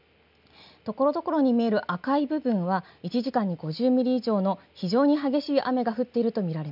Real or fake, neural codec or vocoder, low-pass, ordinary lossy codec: real; none; 5.4 kHz; none